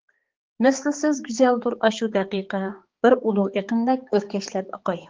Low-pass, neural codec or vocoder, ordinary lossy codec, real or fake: 7.2 kHz; codec, 16 kHz, 4 kbps, X-Codec, HuBERT features, trained on balanced general audio; Opus, 16 kbps; fake